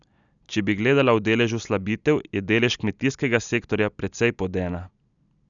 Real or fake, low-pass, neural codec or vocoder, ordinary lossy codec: real; 7.2 kHz; none; none